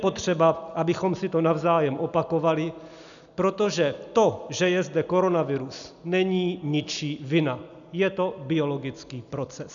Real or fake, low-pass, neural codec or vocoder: real; 7.2 kHz; none